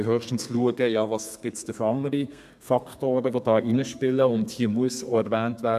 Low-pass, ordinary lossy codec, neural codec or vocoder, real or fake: 14.4 kHz; none; codec, 32 kHz, 1.9 kbps, SNAC; fake